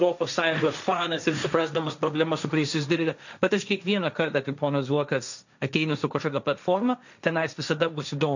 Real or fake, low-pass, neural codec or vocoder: fake; 7.2 kHz; codec, 16 kHz, 1.1 kbps, Voila-Tokenizer